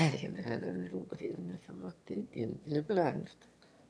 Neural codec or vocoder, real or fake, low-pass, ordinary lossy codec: autoencoder, 22.05 kHz, a latent of 192 numbers a frame, VITS, trained on one speaker; fake; none; none